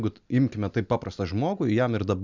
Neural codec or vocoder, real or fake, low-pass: none; real; 7.2 kHz